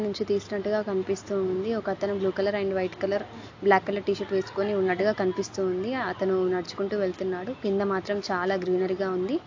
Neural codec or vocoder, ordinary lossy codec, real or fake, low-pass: none; AAC, 48 kbps; real; 7.2 kHz